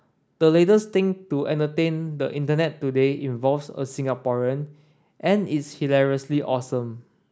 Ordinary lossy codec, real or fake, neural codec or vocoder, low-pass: none; real; none; none